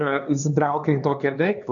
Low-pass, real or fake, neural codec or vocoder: 7.2 kHz; fake; codec, 16 kHz, 2 kbps, X-Codec, HuBERT features, trained on LibriSpeech